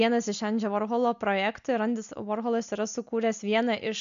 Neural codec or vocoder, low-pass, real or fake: none; 7.2 kHz; real